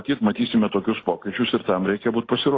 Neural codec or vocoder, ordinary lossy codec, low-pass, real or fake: none; AAC, 32 kbps; 7.2 kHz; real